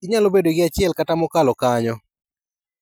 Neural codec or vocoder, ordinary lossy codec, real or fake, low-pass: none; none; real; none